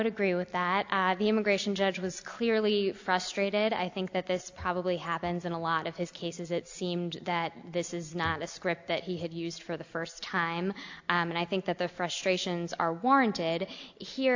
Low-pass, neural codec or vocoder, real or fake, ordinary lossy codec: 7.2 kHz; none; real; AAC, 48 kbps